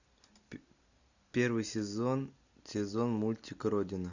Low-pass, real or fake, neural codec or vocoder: 7.2 kHz; real; none